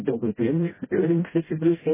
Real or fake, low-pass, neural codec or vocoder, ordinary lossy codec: fake; 3.6 kHz; codec, 16 kHz, 0.5 kbps, FreqCodec, smaller model; MP3, 16 kbps